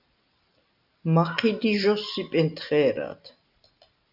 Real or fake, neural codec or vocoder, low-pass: real; none; 5.4 kHz